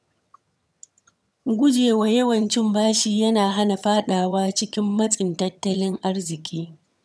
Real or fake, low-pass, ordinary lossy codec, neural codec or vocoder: fake; none; none; vocoder, 22.05 kHz, 80 mel bands, HiFi-GAN